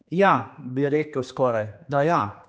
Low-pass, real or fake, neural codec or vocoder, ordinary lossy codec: none; fake; codec, 16 kHz, 2 kbps, X-Codec, HuBERT features, trained on general audio; none